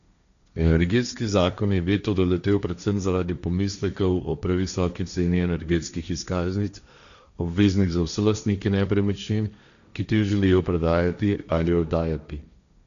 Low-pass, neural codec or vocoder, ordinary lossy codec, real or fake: 7.2 kHz; codec, 16 kHz, 1.1 kbps, Voila-Tokenizer; none; fake